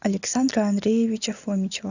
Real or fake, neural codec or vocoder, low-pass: real; none; 7.2 kHz